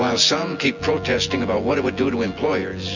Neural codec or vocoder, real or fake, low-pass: vocoder, 24 kHz, 100 mel bands, Vocos; fake; 7.2 kHz